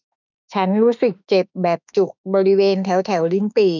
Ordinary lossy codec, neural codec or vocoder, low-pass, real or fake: none; autoencoder, 48 kHz, 32 numbers a frame, DAC-VAE, trained on Japanese speech; 7.2 kHz; fake